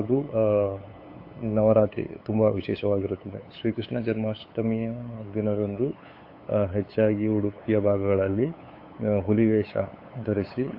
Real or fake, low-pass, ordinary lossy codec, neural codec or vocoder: fake; 5.4 kHz; AAC, 32 kbps; codec, 16 kHz, 8 kbps, FunCodec, trained on LibriTTS, 25 frames a second